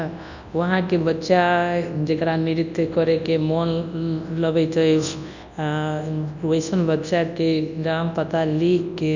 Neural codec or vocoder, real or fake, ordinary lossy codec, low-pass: codec, 24 kHz, 0.9 kbps, WavTokenizer, large speech release; fake; none; 7.2 kHz